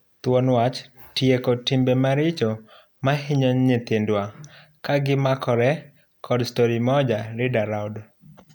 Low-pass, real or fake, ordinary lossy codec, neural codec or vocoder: none; real; none; none